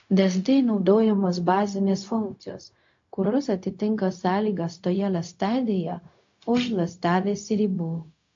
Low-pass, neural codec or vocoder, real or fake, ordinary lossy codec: 7.2 kHz; codec, 16 kHz, 0.4 kbps, LongCat-Audio-Codec; fake; AAC, 48 kbps